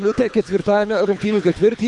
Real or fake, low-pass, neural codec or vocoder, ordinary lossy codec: fake; 10.8 kHz; codec, 24 kHz, 3 kbps, HILCodec; MP3, 96 kbps